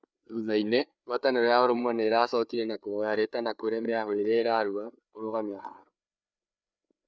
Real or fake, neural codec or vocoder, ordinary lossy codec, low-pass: fake; codec, 16 kHz, 4 kbps, FreqCodec, larger model; none; none